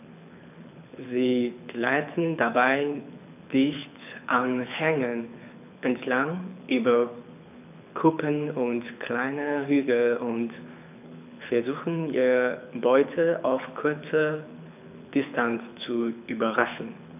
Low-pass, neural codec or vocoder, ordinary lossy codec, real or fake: 3.6 kHz; codec, 24 kHz, 6 kbps, HILCodec; none; fake